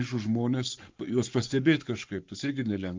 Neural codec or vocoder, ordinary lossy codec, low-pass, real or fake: codec, 44.1 kHz, 7.8 kbps, Pupu-Codec; Opus, 24 kbps; 7.2 kHz; fake